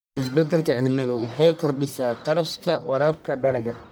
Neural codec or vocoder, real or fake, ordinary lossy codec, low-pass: codec, 44.1 kHz, 1.7 kbps, Pupu-Codec; fake; none; none